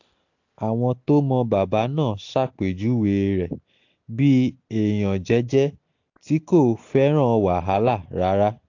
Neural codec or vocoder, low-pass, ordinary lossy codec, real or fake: none; 7.2 kHz; AAC, 48 kbps; real